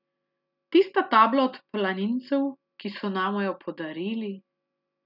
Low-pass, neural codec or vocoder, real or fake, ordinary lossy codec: 5.4 kHz; none; real; none